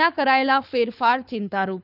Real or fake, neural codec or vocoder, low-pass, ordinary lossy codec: fake; codec, 24 kHz, 6 kbps, HILCodec; 5.4 kHz; none